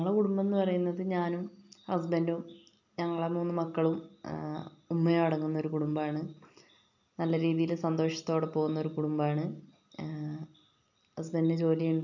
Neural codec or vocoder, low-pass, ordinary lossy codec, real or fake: none; 7.2 kHz; none; real